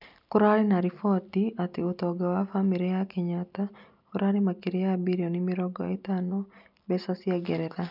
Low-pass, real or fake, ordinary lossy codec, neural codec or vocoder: 5.4 kHz; real; none; none